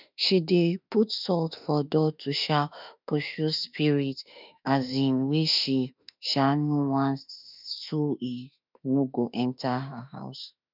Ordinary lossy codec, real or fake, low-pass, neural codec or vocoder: none; fake; 5.4 kHz; autoencoder, 48 kHz, 32 numbers a frame, DAC-VAE, trained on Japanese speech